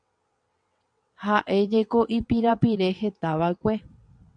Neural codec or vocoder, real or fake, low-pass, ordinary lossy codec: vocoder, 22.05 kHz, 80 mel bands, WaveNeXt; fake; 9.9 kHz; MP3, 64 kbps